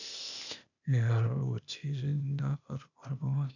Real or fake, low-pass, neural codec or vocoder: fake; 7.2 kHz; codec, 16 kHz, 0.8 kbps, ZipCodec